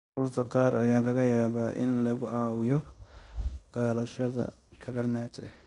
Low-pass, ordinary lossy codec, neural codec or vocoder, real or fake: 10.8 kHz; MP3, 64 kbps; codec, 16 kHz in and 24 kHz out, 0.9 kbps, LongCat-Audio-Codec, fine tuned four codebook decoder; fake